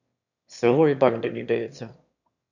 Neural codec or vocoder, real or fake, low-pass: autoencoder, 22.05 kHz, a latent of 192 numbers a frame, VITS, trained on one speaker; fake; 7.2 kHz